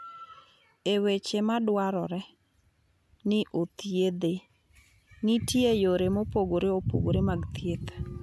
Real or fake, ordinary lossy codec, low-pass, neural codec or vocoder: real; none; none; none